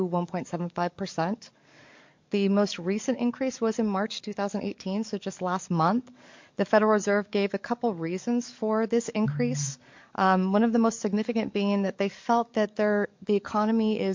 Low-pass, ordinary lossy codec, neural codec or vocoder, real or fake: 7.2 kHz; MP3, 48 kbps; codec, 44.1 kHz, 7.8 kbps, DAC; fake